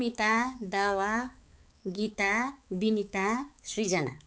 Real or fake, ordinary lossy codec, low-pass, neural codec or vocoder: fake; none; none; codec, 16 kHz, 4 kbps, X-Codec, HuBERT features, trained on balanced general audio